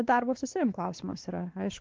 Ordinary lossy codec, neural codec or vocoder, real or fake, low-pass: Opus, 16 kbps; codec, 16 kHz, 2 kbps, X-Codec, WavLM features, trained on Multilingual LibriSpeech; fake; 7.2 kHz